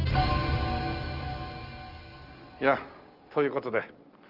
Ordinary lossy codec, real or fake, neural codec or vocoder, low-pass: Opus, 32 kbps; real; none; 5.4 kHz